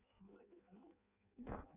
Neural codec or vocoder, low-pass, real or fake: codec, 16 kHz in and 24 kHz out, 0.6 kbps, FireRedTTS-2 codec; 3.6 kHz; fake